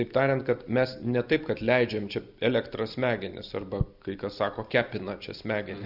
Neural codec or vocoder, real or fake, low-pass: none; real; 5.4 kHz